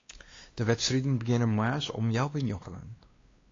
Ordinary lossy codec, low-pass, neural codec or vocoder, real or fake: AAC, 32 kbps; 7.2 kHz; codec, 16 kHz, 2 kbps, X-Codec, WavLM features, trained on Multilingual LibriSpeech; fake